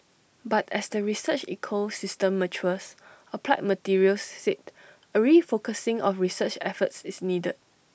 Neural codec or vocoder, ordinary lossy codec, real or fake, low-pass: none; none; real; none